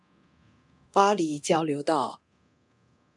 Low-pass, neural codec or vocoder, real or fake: 10.8 kHz; codec, 24 kHz, 0.9 kbps, DualCodec; fake